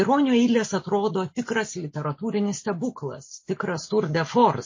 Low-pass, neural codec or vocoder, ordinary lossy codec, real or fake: 7.2 kHz; none; MP3, 32 kbps; real